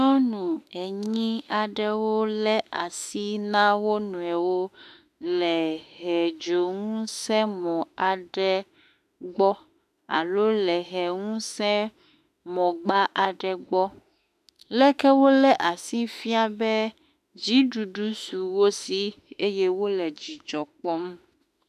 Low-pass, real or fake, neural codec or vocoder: 14.4 kHz; fake; autoencoder, 48 kHz, 32 numbers a frame, DAC-VAE, trained on Japanese speech